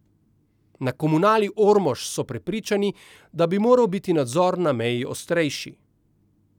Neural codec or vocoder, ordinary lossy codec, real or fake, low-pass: none; none; real; 19.8 kHz